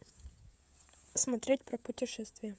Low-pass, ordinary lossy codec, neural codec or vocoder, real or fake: none; none; codec, 16 kHz, 16 kbps, FreqCodec, smaller model; fake